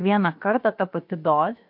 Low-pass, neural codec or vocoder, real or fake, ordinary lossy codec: 5.4 kHz; codec, 16 kHz, about 1 kbps, DyCAST, with the encoder's durations; fake; MP3, 48 kbps